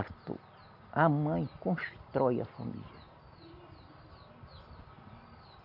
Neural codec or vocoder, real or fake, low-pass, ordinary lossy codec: none; real; 5.4 kHz; none